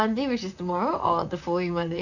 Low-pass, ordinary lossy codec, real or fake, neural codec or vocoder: 7.2 kHz; none; fake; vocoder, 44.1 kHz, 128 mel bands, Pupu-Vocoder